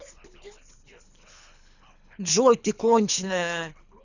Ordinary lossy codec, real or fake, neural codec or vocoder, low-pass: none; fake; codec, 24 kHz, 3 kbps, HILCodec; 7.2 kHz